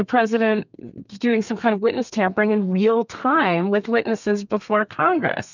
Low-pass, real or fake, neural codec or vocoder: 7.2 kHz; fake; codec, 32 kHz, 1.9 kbps, SNAC